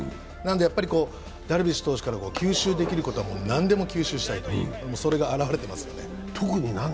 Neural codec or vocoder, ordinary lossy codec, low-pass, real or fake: none; none; none; real